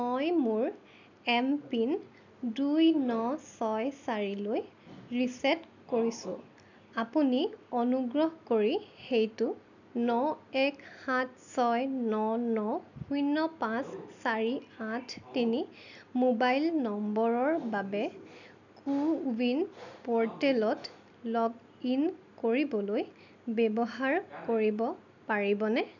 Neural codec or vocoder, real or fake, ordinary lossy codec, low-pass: none; real; none; 7.2 kHz